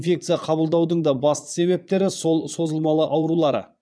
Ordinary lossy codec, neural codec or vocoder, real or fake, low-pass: none; vocoder, 22.05 kHz, 80 mel bands, Vocos; fake; none